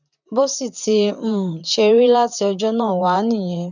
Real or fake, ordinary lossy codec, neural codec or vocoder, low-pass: fake; none; vocoder, 44.1 kHz, 128 mel bands, Pupu-Vocoder; 7.2 kHz